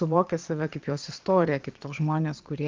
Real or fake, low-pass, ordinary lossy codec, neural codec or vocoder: fake; 7.2 kHz; Opus, 32 kbps; vocoder, 22.05 kHz, 80 mel bands, Vocos